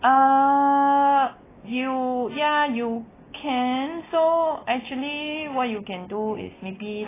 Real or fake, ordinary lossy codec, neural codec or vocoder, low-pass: real; AAC, 16 kbps; none; 3.6 kHz